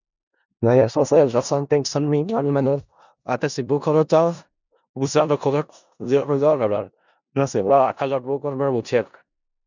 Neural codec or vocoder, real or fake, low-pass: codec, 16 kHz in and 24 kHz out, 0.4 kbps, LongCat-Audio-Codec, four codebook decoder; fake; 7.2 kHz